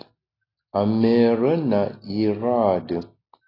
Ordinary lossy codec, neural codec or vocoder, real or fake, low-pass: AAC, 32 kbps; none; real; 5.4 kHz